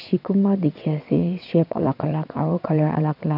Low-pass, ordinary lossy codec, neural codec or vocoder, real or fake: 5.4 kHz; none; vocoder, 22.05 kHz, 80 mel bands, WaveNeXt; fake